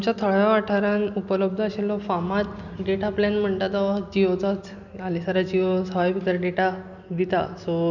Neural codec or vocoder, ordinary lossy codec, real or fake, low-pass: none; none; real; 7.2 kHz